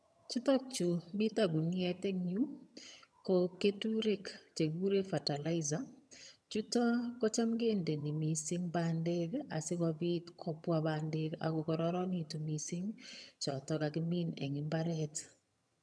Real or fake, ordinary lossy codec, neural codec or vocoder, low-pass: fake; none; vocoder, 22.05 kHz, 80 mel bands, HiFi-GAN; none